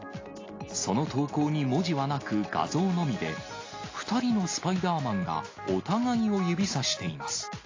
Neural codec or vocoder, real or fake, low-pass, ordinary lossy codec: none; real; 7.2 kHz; AAC, 32 kbps